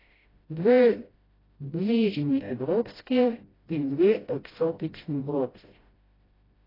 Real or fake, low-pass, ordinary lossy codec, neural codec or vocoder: fake; 5.4 kHz; AAC, 24 kbps; codec, 16 kHz, 0.5 kbps, FreqCodec, smaller model